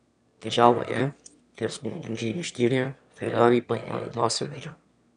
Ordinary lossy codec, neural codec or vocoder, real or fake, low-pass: none; autoencoder, 22.05 kHz, a latent of 192 numbers a frame, VITS, trained on one speaker; fake; 9.9 kHz